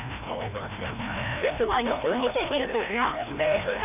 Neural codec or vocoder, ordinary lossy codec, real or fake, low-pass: codec, 16 kHz, 1 kbps, FreqCodec, larger model; none; fake; 3.6 kHz